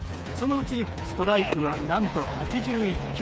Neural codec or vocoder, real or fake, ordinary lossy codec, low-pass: codec, 16 kHz, 4 kbps, FreqCodec, smaller model; fake; none; none